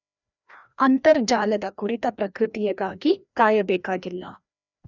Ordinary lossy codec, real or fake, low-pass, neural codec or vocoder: none; fake; 7.2 kHz; codec, 16 kHz, 1 kbps, FreqCodec, larger model